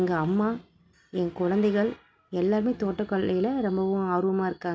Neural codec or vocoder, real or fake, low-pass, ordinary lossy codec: none; real; none; none